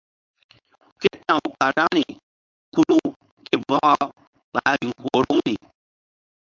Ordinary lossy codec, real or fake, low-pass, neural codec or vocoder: MP3, 64 kbps; fake; 7.2 kHz; codec, 16 kHz, 4.8 kbps, FACodec